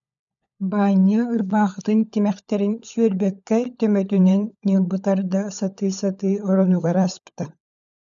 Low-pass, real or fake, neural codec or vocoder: 7.2 kHz; fake; codec, 16 kHz, 16 kbps, FunCodec, trained on LibriTTS, 50 frames a second